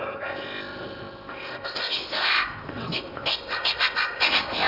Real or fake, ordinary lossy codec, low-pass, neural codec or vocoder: fake; none; 5.4 kHz; codec, 16 kHz in and 24 kHz out, 0.8 kbps, FocalCodec, streaming, 65536 codes